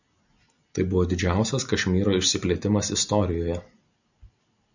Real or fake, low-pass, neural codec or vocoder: real; 7.2 kHz; none